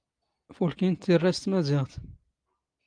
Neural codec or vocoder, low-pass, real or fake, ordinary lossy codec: none; 9.9 kHz; real; Opus, 32 kbps